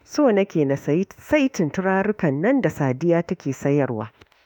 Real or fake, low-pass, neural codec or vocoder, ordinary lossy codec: fake; 19.8 kHz; autoencoder, 48 kHz, 32 numbers a frame, DAC-VAE, trained on Japanese speech; none